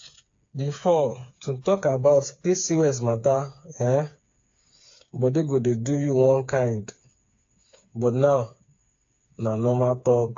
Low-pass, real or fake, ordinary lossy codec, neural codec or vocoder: 7.2 kHz; fake; AAC, 48 kbps; codec, 16 kHz, 4 kbps, FreqCodec, smaller model